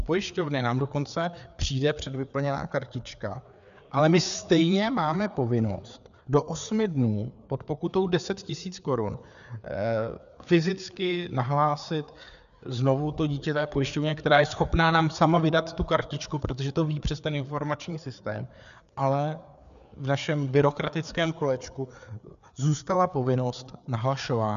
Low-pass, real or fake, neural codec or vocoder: 7.2 kHz; fake; codec, 16 kHz, 4 kbps, FreqCodec, larger model